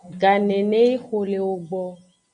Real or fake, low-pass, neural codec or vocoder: real; 9.9 kHz; none